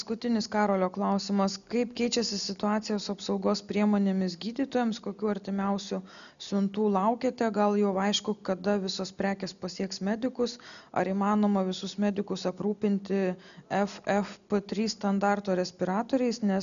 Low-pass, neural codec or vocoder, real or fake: 7.2 kHz; none; real